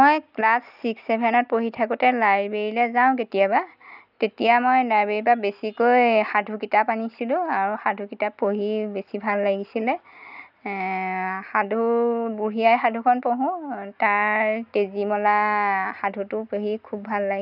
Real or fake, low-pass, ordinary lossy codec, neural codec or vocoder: real; 5.4 kHz; none; none